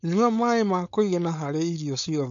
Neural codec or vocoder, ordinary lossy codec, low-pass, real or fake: codec, 16 kHz, 4.8 kbps, FACodec; none; 7.2 kHz; fake